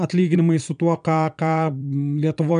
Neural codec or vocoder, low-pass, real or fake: vocoder, 22.05 kHz, 80 mel bands, Vocos; 9.9 kHz; fake